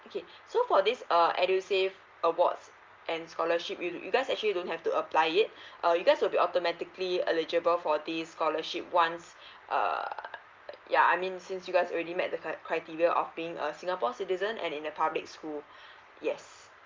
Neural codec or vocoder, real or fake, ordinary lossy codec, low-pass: none; real; Opus, 24 kbps; 7.2 kHz